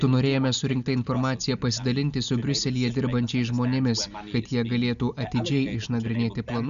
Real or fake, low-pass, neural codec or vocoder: real; 7.2 kHz; none